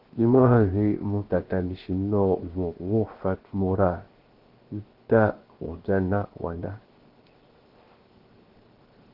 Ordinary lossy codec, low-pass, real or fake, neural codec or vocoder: Opus, 16 kbps; 5.4 kHz; fake; codec, 16 kHz, 0.7 kbps, FocalCodec